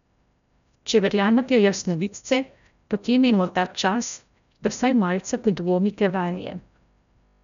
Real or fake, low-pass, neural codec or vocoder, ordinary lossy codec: fake; 7.2 kHz; codec, 16 kHz, 0.5 kbps, FreqCodec, larger model; none